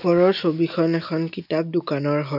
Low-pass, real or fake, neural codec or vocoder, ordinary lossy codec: 5.4 kHz; real; none; none